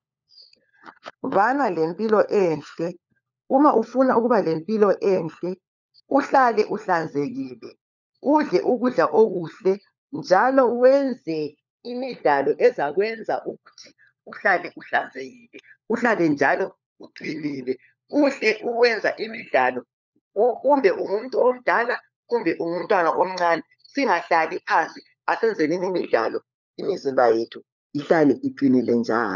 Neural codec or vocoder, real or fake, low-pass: codec, 16 kHz, 4 kbps, FunCodec, trained on LibriTTS, 50 frames a second; fake; 7.2 kHz